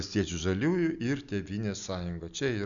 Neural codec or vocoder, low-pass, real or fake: none; 7.2 kHz; real